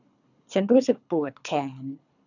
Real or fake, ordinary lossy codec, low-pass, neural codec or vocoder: fake; none; 7.2 kHz; codec, 24 kHz, 3 kbps, HILCodec